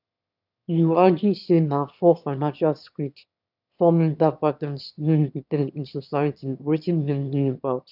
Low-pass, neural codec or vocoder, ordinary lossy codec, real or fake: 5.4 kHz; autoencoder, 22.05 kHz, a latent of 192 numbers a frame, VITS, trained on one speaker; none; fake